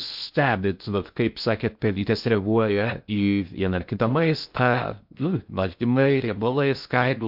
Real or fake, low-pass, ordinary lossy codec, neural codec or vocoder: fake; 5.4 kHz; MP3, 48 kbps; codec, 16 kHz in and 24 kHz out, 0.6 kbps, FocalCodec, streaming, 2048 codes